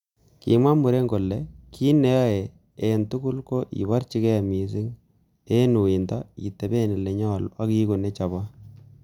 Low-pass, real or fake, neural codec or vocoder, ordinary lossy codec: 19.8 kHz; real; none; none